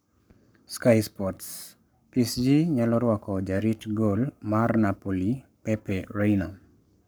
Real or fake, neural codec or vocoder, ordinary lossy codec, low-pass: fake; codec, 44.1 kHz, 7.8 kbps, Pupu-Codec; none; none